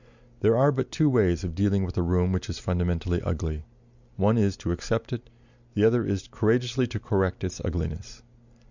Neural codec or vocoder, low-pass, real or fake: none; 7.2 kHz; real